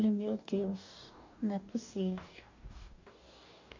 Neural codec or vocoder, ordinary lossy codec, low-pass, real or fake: codec, 44.1 kHz, 2.6 kbps, DAC; MP3, 64 kbps; 7.2 kHz; fake